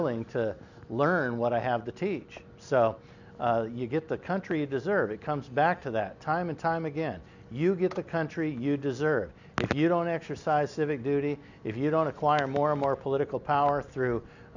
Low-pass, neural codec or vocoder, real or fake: 7.2 kHz; none; real